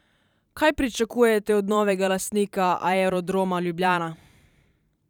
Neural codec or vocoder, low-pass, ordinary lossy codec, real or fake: vocoder, 44.1 kHz, 128 mel bands every 512 samples, BigVGAN v2; 19.8 kHz; none; fake